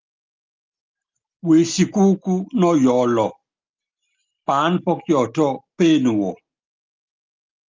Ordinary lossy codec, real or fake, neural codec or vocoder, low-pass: Opus, 24 kbps; real; none; 7.2 kHz